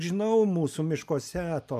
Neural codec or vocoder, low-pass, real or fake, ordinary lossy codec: none; 14.4 kHz; real; AAC, 96 kbps